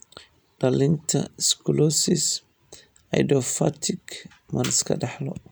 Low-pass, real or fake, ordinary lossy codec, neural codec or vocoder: none; real; none; none